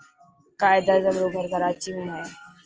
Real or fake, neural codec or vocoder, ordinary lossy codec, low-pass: real; none; Opus, 24 kbps; 7.2 kHz